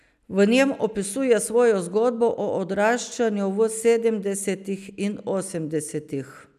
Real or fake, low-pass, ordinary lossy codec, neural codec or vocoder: real; 14.4 kHz; none; none